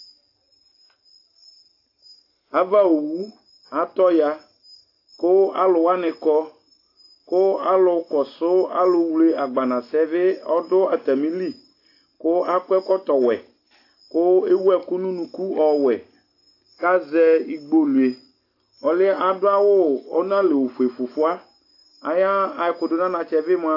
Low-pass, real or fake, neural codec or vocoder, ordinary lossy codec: 5.4 kHz; real; none; AAC, 32 kbps